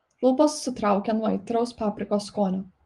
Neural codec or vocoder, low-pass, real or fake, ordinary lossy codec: vocoder, 24 kHz, 100 mel bands, Vocos; 10.8 kHz; fake; Opus, 24 kbps